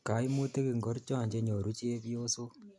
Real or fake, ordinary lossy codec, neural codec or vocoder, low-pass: real; none; none; none